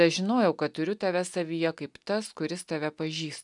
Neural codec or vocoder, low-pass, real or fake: none; 10.8 kHz; real